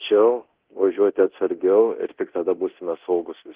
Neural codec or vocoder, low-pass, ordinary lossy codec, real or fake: codec, 24 kHz, 0.9 kbps, DualCodec; 3.6 kHz; Opus, 16 kbps; fake